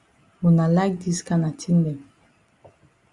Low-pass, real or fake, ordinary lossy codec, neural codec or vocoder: 10.8 kHz; real; Opus, 64 kbps; none